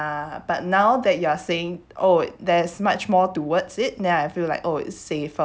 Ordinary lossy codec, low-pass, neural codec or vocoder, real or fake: none; none; none; real